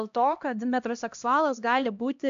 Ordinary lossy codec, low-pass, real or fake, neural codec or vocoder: AAC, 64 kbps; 7.2 kHz; fake; codec, 16 kHz, 1 kbps, X-Codec, HuBERT features, trained on LibriSpeech